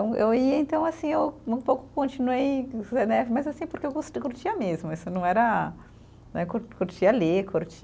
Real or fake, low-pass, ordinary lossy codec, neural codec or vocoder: real; none; none; none